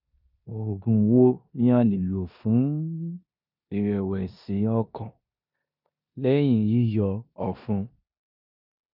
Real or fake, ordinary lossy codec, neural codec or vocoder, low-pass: fake; none; codec, 16 kHz in and 24 kHz out, 0.9 kbps, LongCat-Audio-Codec, four codebook decoder; 5.4 kHz